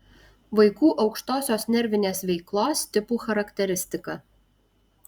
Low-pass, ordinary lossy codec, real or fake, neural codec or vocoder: 19.8 kHz; Opus, 64 kbps; fake; vocoder, 44.1 kHz, 128 mel bands every 512 samples, BigVGAN v2